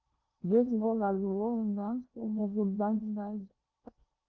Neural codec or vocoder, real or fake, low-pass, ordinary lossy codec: codec, 16 kHz in and 24 kHz out, 0.8 kbps, FocalCodec, streaming, 65536 codes; fake; 7.2 kHz; Opus, 24 kbps